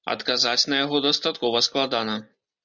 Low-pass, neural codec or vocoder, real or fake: 7.2 kHz; none; real